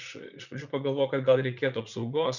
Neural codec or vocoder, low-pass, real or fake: vocoder, 44.1 kHz, 80 mel bands, Vocos; 7.2 kHz; fake